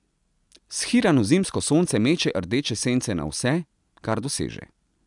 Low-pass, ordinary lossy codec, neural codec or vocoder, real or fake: 10.8 kHz; none; none; real